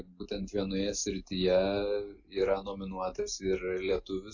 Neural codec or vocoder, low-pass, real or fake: none; 7.2 kHz; real